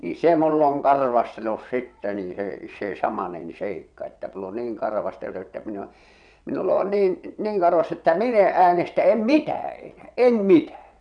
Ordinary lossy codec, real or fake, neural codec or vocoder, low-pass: none; fake; vocoder, 22.05 kHz, 80 mel bands, WaveNeXt; 9.9 kHz